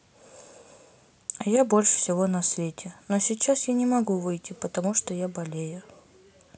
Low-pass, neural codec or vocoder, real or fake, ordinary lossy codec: none; none; real; none